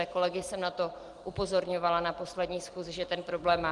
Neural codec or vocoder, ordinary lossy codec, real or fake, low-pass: none; Opus, 24 kbps; real; 10.8 kHz